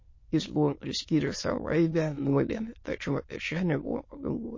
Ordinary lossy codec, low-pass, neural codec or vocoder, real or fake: MP3, 32 kbps; 7.2 kHz; autoencoder, 22.05 kHz, a latent of 192 numbers a frame, VITS, trained on many speakers; fake